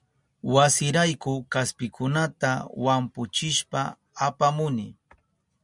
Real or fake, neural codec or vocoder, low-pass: real; none; 10.8 kHz